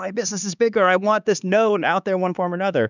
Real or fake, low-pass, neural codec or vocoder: fake; 7.2 kHz; codec, 16 kHz, 4 kbps, X-Codec, HuBERT features, trained on LibriSpeech